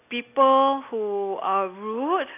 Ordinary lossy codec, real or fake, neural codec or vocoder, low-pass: AAC, 24 kbps; real; none; 3.6 kHz